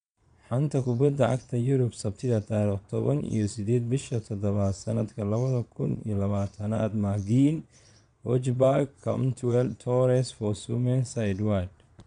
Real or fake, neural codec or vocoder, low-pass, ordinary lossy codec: fake; vocoder, 22.05 kHz, 80 mel bands, Vocos; 9.9 kHz; MP3, 96 kbps